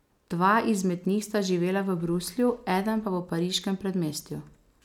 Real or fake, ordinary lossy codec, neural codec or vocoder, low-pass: real; none; none; 19.8 kHz